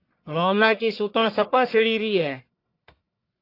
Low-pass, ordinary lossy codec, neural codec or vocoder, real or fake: 5.4 kHz; AAC, 32 kbps; codec, 44.1 kHz, 1.7 kbps, Pupu-Codec; fake